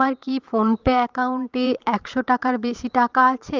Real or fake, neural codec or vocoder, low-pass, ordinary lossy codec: fake; vocoder, 44.1 kHz, 128 mel bands every 512 samples, BigVGAN v2; 7.2 kHz; Opus, 32 kbps